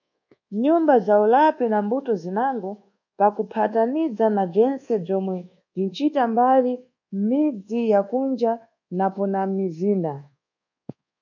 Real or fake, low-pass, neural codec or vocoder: fake; 7.2 kHz; codec, 24 kHz, 1.2 kbps, DualCodec